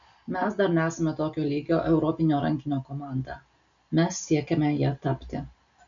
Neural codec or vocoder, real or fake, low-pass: none; real; 7.2 kHz